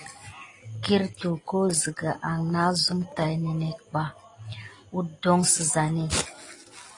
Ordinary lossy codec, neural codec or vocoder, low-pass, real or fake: AAC, 32 kbps; none; 10.8 kHz; real